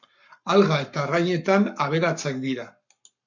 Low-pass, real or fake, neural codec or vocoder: 7.2 kHz; fake; codec, 44.1 kHz, 7.8 kbps, Pupu-Codec